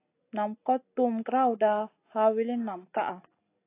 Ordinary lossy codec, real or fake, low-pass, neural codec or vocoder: AAC, 24 kbps; real; 3.6 kHz; none